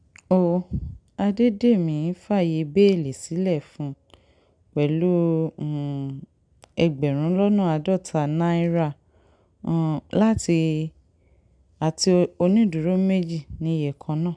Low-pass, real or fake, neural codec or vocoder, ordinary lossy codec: 9.9 kHz; real; none; none